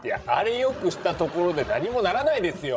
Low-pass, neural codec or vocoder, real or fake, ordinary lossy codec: none; codec, 16 kHz, 16 kbps, FreqCodec, larger model; fake; none